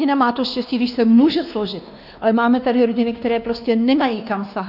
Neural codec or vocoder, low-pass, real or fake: codec, 16 kHz, 2 kbps, X-Codec, WavLM features, trained on Multilingual LibriSpeech; 5.4 kHz; fake